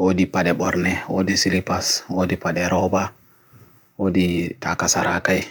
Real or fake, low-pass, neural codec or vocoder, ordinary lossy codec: fake; none; vocoder, 44.1 kHz, 128 mel bands, Pupu-Vocoder; none